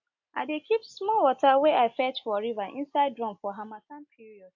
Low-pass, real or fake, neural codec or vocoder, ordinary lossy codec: 7.2 kHz; real; none; AAC, 48 kbps